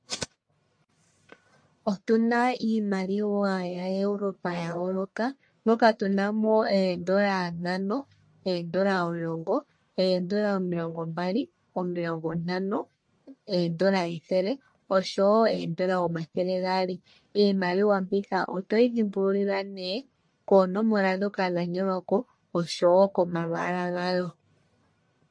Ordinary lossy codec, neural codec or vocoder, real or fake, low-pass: MP3, 48 kbps; codec, 44.1 kHz, 1.7 kbps, Pupu-Codec; fake; 9.9 kHz